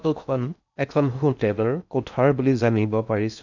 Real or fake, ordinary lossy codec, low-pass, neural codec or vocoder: fake; none; 7.2 kHz; codec, 16 kHz in and 24 kHz out, 0.6 kbps, FocalCodec, streaming, 4096 codes